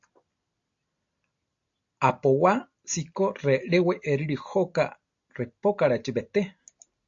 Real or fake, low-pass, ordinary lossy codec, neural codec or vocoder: real; 7.2 kHz; AAC, 48 kbps; none